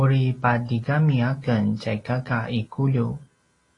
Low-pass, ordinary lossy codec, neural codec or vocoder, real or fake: 10.8 kHz; AAC, 32 kbps; none; real